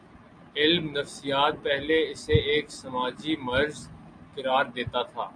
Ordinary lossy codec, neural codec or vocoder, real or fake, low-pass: MP3, 96 kbps; none; real; 9.9 kHz